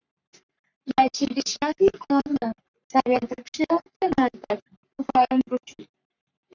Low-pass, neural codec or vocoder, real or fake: 7.2 kHz; codec, 44.1 kHz, 3.4 kbps, Pupu-Codec; fake